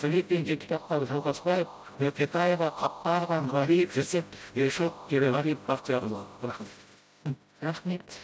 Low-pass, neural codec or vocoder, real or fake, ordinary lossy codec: none; codec, 16 kHz, 0.5 kbps, FreqCodec, smaller model; fake; none